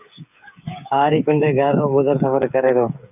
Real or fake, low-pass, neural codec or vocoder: fake; 3.6 kHz; codec, 16 kHz, 8 kbps, FreqCodec, smaller model